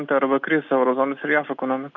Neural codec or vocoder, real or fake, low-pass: none; real; 7.2 kHz